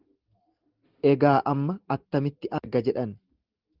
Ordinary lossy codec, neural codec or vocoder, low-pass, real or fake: Opus, 16 kbps; none; 5.4 kHz; real